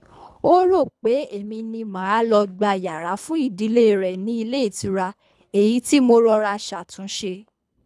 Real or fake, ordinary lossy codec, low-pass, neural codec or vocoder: fake; none; none; codec, 24 kHz, 3 kbps, HILCodec